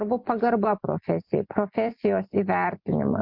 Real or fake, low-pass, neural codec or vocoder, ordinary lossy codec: real; 5.4 kHz; none; MP3, 48 kbps